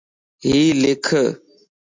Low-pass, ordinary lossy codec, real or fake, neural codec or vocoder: 7.2 kHz; MP3, 64 kbps; real; none